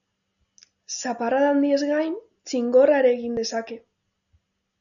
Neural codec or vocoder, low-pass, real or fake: none; 7.2 kHz; real